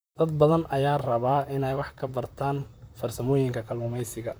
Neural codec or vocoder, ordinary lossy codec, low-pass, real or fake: vocoder, 44.1 kHz, 128 mel bands, Pupu-Vocoder; none; none; fake